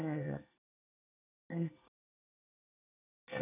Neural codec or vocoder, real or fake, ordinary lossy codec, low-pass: codec, 24 kHz, 1 kbps, SNAC; fake; none; 3.6 kHz